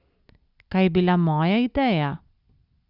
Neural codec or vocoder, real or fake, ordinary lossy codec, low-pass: none; real; Opus, 64 kbps; 5.4 kHz